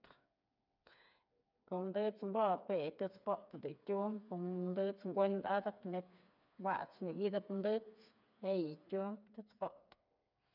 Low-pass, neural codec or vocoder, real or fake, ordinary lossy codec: 5.4 kHz; codec, 16 kHz, 4 kbps, FreqCodec, smaller model; fake; none